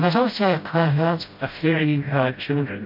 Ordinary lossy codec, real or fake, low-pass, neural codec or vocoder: MP3, 32 kbps; fake; 5.4 kHz; codec, 16 kHz, 0.5 kbps, FreqCodec, smaller model